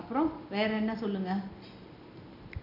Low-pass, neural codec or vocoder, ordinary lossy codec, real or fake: 5.4 kHz; none; none; real